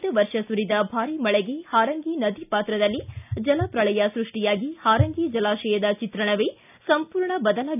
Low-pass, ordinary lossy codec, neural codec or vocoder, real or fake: 3.6 kHz; none; none; real